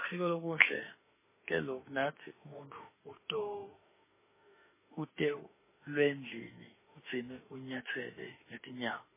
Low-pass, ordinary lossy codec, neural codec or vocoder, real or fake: 3.6 kHz; MP3, 16 kbps; autoencoder, 48 kHz, 32 numbers a frame, DAC-VAE, trained on Japanese speech; fake